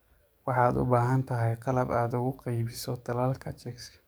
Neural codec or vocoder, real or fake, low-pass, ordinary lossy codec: codec, 44.1 kHz, 7.8 kbps, DAC; fake; none; none